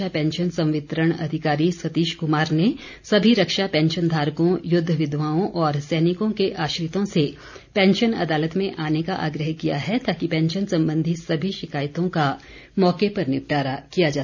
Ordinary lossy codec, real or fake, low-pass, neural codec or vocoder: none; real; 7.2 kHz; none